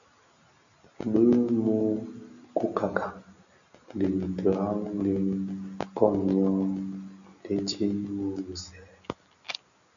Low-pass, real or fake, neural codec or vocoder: 7.2 kHz; real; none